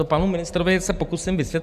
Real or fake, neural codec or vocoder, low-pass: real; none; 14.4 kHz